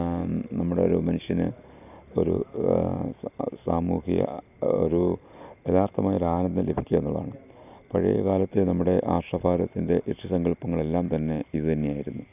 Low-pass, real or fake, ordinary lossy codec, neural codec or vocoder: 3.6 kHz; real; none; none